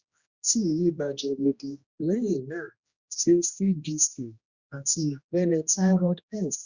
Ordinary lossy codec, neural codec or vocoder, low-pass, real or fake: Opus, 64 kbps; codec, 16 kHz, 1 kbps, X-Codec, HuBERT features, trained on general audio; 7.2 kHz; fake